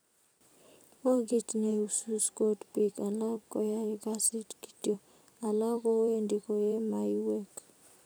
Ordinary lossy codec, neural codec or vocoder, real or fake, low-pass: none; vocoder, 44.1 kHz, 128 mel bands every 256 samples, BigVGAN v2; fake; none